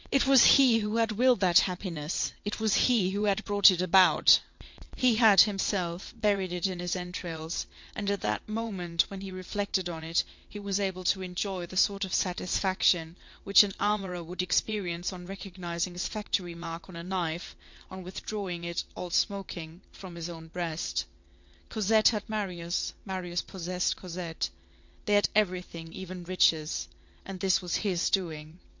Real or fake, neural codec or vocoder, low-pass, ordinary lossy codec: fake; vocoder, 22.05 kHz, 80 mel bands, WaveNeXt; 7.2 kHz; MP3, 48 kbps